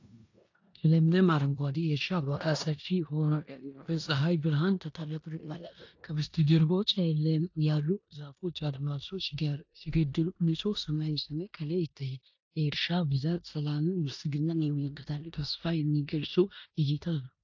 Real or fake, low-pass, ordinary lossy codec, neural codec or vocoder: fake; 7.2 kHz; AAC, 48 kbps; codec, 16 kHz in and 24 kHz out, 0.9 kbps, LongCat-Audio-Codec, four codebook decoder